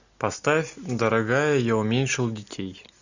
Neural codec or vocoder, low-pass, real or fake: none; 7.2 kHz; real